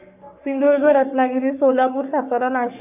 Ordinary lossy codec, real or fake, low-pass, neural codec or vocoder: none; fake; 3.6 kHz; codec, 44.1 kHz, 3.4 kbps, Pupu-Codec